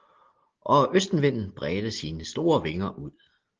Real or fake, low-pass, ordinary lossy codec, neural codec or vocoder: real; 7.2 kHz; Opus, 16 kbps; none